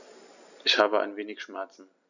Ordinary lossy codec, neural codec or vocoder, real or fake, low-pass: none; none; real; 7.2 kHz